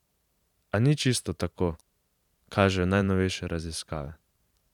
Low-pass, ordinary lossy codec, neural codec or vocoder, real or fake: 19.8 kHz; none; vocoder, 44.1 kHz, 128 mel bands every 512 samples, BigVGAN v2; fake